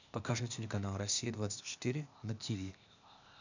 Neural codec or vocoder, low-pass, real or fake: codec, 16 kHz, 0.8 kbps, ZipCodec; 7.2 kHz; fake